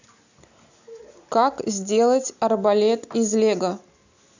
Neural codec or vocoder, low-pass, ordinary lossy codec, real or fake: none; 7.2 kHz; none; real